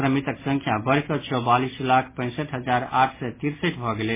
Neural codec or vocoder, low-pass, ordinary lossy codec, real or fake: none; 3.6 kHz; MP3, 16 kbps; real